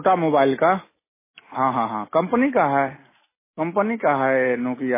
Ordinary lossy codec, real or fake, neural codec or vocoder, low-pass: MP3, 16 kbps; real; none; 3.6 kHz